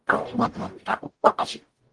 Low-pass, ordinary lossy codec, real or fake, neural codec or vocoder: 10.8 kHz; Opus, 24 kbps; fake; codec, 44.1 kHz, 0.9 kbps, DAC